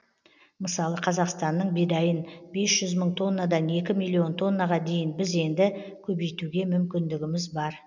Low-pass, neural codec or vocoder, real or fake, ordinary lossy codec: 7.2 kHz; none; real; none